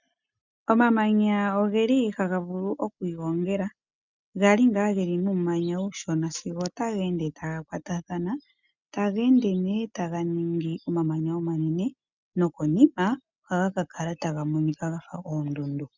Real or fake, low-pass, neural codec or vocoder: real; 7.2 kHz; none